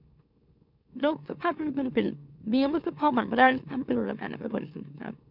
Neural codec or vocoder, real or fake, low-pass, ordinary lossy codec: autoencoder, 44.1 kHz, a latent of 192 numbers a frame, MeloTTS; fake; 5.4 kHz; none